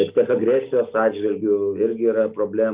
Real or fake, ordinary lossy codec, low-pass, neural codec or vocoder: real; Opus, 24 kbps; 3.6 kHz; none